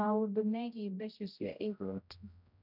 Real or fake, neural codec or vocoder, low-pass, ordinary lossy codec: fake; codec, 16 kHz, 0.5 kbps, X-Codec, HuBERT features, trained on general audio; 5.4 kHz; none